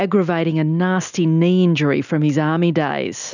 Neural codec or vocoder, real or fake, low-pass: none; real; 7.2 kHz